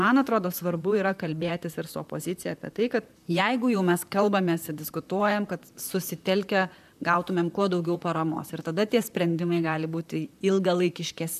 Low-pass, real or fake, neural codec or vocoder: 14.4 kHz; fake; vocoder, 44.1 kHz, 128 mel bands, Pupu-Vocoder